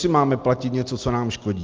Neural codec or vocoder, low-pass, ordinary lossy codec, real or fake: none; 7.2 kHz; Opus, 32 kbps; real